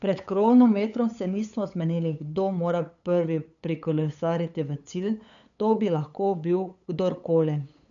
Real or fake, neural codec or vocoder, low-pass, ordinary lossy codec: fake; codec, 16 kHz, 8 kbps, FunCodec, trained on LibriTTS, 25 frames a second; 7.2 kHz; none